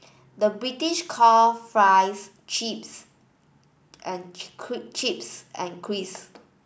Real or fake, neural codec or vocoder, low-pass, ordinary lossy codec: real; none; none; none